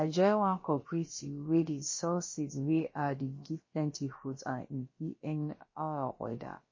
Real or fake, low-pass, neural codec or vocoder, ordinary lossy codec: fake; 7.2 kHz; codec, 16 kHz, about 1 kbps, DyCAST, with the encoder's durations; MP3, 32 kbps